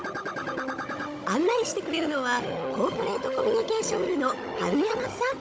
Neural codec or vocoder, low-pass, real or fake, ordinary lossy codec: codec, 16 kHz, 16 kbps, FunCodec, trained on Chinese and English, 50 frames a second; none; fake; none